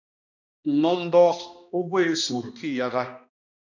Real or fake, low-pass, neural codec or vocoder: fake; 7.2 kHz; codec, 16 kHz, 1 kbps, X-Codec, HuBERT features, trained on balanced general audio